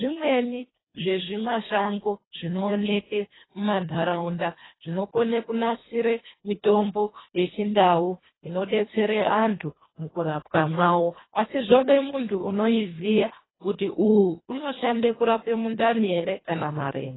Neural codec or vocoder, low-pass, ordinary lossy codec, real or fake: codec, 24 kHz, 1.5 kbps, HILCodec; 7.2 kHz; AAC, 16 kbps; fake